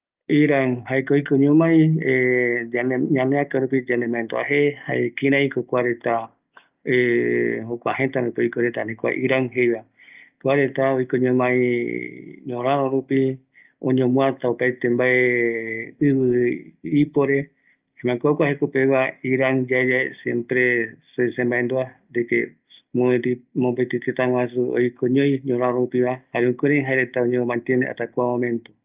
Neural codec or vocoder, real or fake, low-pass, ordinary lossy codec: none; real; 3.6 kHz; Opus, 24 kbps